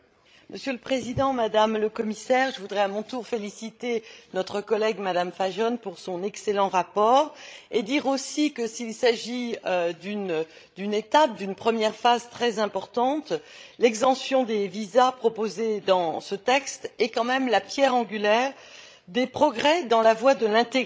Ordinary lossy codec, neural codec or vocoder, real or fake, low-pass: none; codec, 16 kHz, 16 kbps, FreqCodec, larger model; fake; none